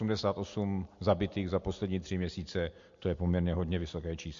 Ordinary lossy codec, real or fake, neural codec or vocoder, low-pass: MP3, 48 kbps; real; none; 7.2 kHz